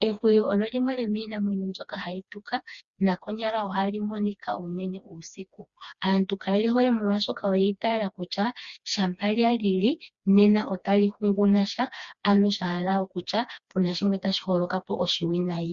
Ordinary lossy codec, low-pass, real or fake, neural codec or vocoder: Opus, 64 kbps; 7.2 kHz; fake; codec, 16 kHz, 2 kbps, FreqCodec, smaller model